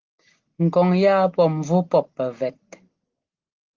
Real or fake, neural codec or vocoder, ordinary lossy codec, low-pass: real; none; Opus, 16 kbps; 7.2 kHz